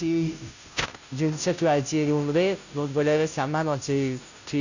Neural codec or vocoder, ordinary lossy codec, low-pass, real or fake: codec, 16 kHz, 0.5 kbps, FunCodec, trained on Chinese and English, 25 frames a second; none; 7.2 kHz; fake